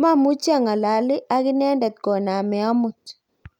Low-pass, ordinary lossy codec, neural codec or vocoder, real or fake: 19.8 kHz; none; none; real